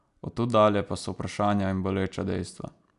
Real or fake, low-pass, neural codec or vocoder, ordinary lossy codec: real; 10.8 kHz; none; none